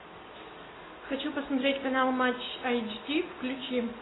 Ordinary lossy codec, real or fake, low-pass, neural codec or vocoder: AAC, 16 kbps; real; 7.2 kHz; none